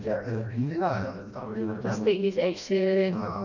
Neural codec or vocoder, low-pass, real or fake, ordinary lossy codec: codec, 16 kHz, 1 kbps, FreqCodec, smaller model; 7.2 kHz; fake; none